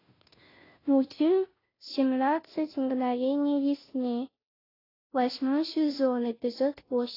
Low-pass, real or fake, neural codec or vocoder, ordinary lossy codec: 5.4 kHz; fake; codec, 16 kHz, 0.5 kbps, FunCodec, trained on Chinese and English, 25 frames a second; AAC, 24 kbps